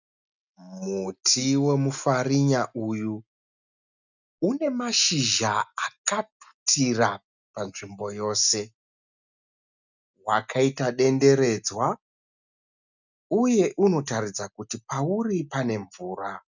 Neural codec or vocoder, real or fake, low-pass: none; real; 7.2 kHz